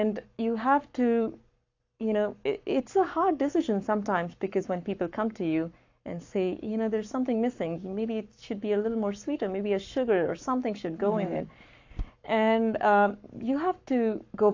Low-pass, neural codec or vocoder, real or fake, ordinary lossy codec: 7.2 kHz; codec, 44.1 kHz, 7.8 kbps, Pupu-Codec; fake; AAC, 48 kbps